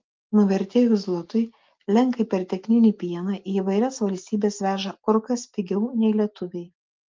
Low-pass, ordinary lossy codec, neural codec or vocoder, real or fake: 7.2 kHz; Opus, 24 kbps; none; real